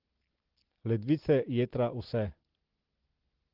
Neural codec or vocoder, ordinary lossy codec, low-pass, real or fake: none; Opus, 16 kbps; 5.4 kHz; real